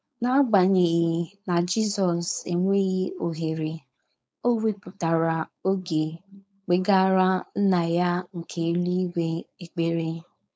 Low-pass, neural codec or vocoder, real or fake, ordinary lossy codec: none; codec, 16 kHz, 4.8 kbps, FACodec; fake; none